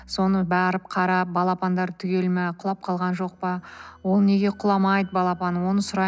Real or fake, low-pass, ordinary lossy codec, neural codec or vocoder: real; none; none; none